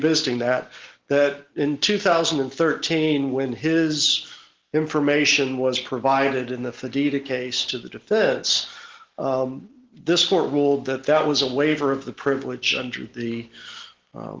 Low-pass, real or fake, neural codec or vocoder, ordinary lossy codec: 7.2 kHz; real; none; Opus, 16 kbps